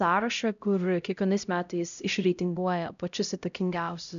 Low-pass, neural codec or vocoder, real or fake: 7.2 kHz; codec, 16 kHz, 0.5 kbps, X-Codec, HuBERT features, trained on LibriSpeech; fake